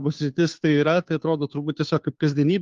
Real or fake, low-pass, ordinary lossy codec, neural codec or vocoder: fake; 7.2 kHz; Opus, 32 kbps; codec, 16 kHz, 2 kbps, FunCodec, trained on Chinese and English, 25 frames a second